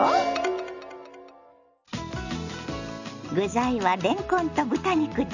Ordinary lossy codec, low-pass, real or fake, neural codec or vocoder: none; 7.2 kHz; real; none